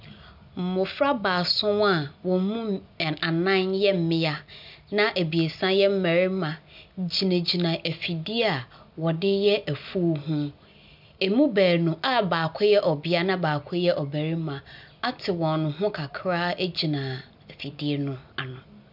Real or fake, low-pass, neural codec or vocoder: real; 5.4 kHz; none